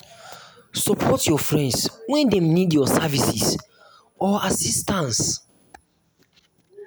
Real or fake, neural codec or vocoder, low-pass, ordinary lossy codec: real; none; none; none